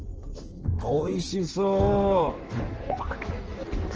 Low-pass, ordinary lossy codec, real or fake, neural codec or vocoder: 7.2 kHz; Opus, 16 kbps; fake; codec, 16 kHz in and 24 kHz out, 1.1 kbps, FireRedTTS-2 codec